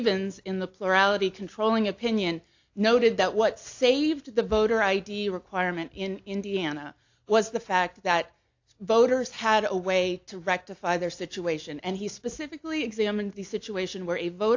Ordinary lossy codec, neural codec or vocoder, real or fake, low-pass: AAC, 48 kbps; none; real; 7.2 kHz